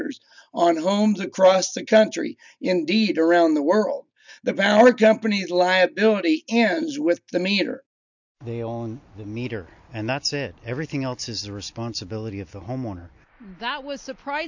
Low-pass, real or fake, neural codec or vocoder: 7.2 kHz; real; none